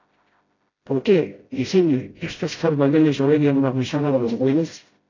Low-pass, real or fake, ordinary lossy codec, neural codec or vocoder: 7.2 kHz; fake; AAC, 32 kbps; codec, 16 kHz, 0.5 kbps, FreqCodec, smaller model